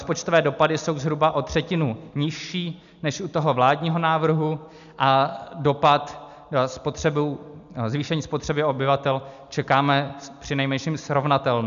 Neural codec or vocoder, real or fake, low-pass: none; real; 7.2 kHz